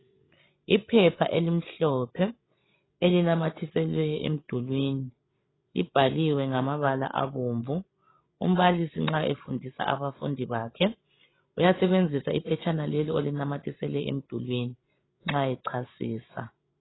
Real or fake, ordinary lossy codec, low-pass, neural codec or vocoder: fake; AAC, 16 kbps; 7.2 kHz; codec, 16 kHz, 16 kbps, FreqCodec, larger model